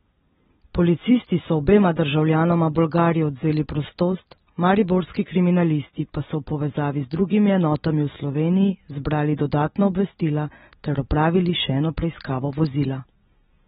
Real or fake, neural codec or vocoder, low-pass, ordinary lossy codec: real; none; 19.8 kHz; AAC, 16 kbps